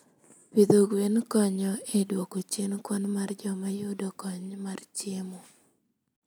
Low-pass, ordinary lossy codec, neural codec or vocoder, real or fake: none; none; none; real